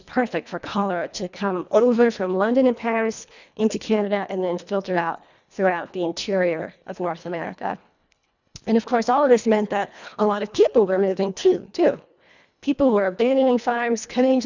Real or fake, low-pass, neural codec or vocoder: fake; 7.2 kHz; codec, 24 kHz, 1.5 kbps, HILCodec